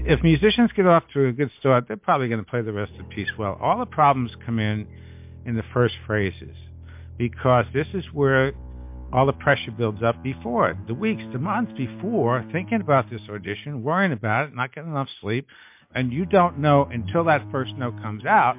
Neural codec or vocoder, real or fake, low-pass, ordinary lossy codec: none; real; 3.6 kHz; MP3, 32 kbps